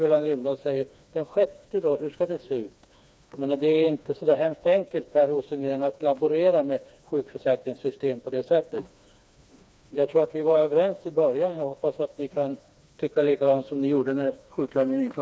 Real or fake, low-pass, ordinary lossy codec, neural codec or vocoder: fake; none; none; codec, 16 kHz, 2 kbps, FreqCodec, smaller model